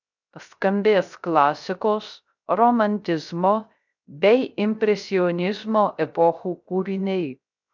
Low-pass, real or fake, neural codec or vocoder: 7.2 kHz; fake; codec, 16 kHz, 0.3 kbps, FocalCodec